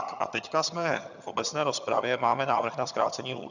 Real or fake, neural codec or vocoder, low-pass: fake; vocoder, 22.05 kHz, 80 mel bands, HiFi-GAN; 7.2 kHz